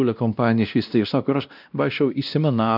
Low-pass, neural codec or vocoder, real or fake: 5.4 kHz; codec, 16 kHz, 1 kbps, X-Codec, WavLM features, trained on Multilingual LibriSpeech; fake